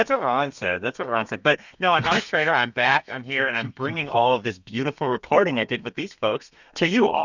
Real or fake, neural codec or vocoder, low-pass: fake; codec, 32 kHz, 1.9 kbps, SNAC; 7.2 kHz